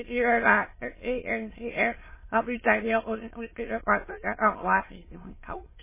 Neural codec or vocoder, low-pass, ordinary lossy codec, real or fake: autoencoder, 22.05 kHz, a latent of 192 numbers a frame, VITS, trained on many speakers; 3.6 kHz; MP3, 16 kbps; fake